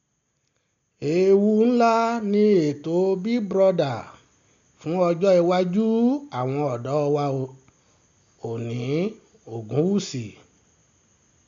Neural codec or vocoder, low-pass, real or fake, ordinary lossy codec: none; 7.2 kHz; real; MP3, 64 kbps